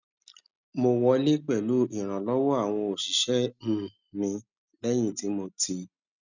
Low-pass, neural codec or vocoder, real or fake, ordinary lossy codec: 7.2 kHz; none; real; none